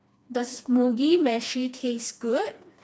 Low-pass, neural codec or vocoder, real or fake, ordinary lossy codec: none; codec, 16 kHz, 2 kbps, FreqCodec, smaller model; fake; none